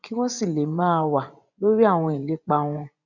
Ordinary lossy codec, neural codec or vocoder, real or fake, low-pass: none; vocoder, 24 kHz, 100 mel bands, Vocos; fake; 7.2 kHz